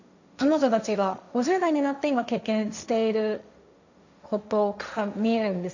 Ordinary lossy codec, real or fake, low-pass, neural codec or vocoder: none; fake; 7.2 kHz; codec, 16 kHz, 1.1 kbps, Voila-Tokenizer